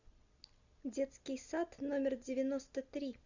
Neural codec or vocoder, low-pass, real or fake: none; 7.2 kHz; real